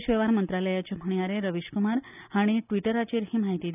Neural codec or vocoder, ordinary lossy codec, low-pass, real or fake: none; none; 3.6 kHz; real